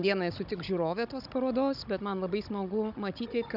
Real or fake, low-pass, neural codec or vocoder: fake; 5.4 kHz; codec, 16 kHz, 16 kbps, FunCodec, trained on Chinese and English, 50 frames a second